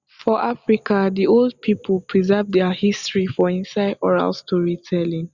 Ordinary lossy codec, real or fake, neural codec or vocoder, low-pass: Opus, 64 kbps; real; none; 7.2 kHz